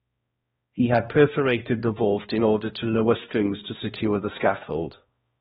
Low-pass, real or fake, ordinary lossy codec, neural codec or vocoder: 7.2 kHz; fake; AAC, 16 kbps; codec, 16 kHz, 1 kbps, X-Codec, HuBERT features, trained on balanced general audio